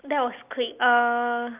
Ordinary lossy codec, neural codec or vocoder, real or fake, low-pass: Opus, 24 kbps; none; real; 3.6 kHz